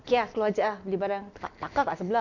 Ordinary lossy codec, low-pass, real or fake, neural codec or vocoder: none; 7.2 kHz; real; none